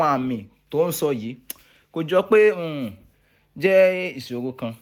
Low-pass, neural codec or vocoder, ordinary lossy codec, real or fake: 19.8 kHz; none; none; real